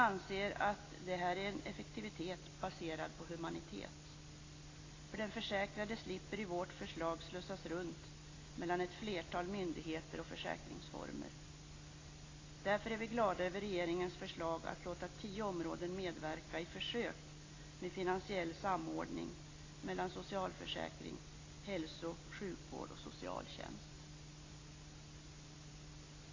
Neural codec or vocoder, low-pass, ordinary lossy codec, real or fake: none; 7.2 kHz; AAC, 32 kbps; real